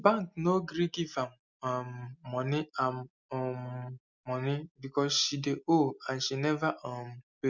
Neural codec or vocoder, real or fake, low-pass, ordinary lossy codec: none; real; 7.2 kHz; none